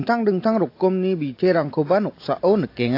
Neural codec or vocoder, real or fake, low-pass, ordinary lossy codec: none; real; 5.4 kHz; AAC, 32 kbps